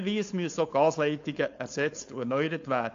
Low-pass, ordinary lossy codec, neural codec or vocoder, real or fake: 7.2 kHz; AAC, 48 kbps; codec, 16 kHz, 4.8 kbps, FACodec; fake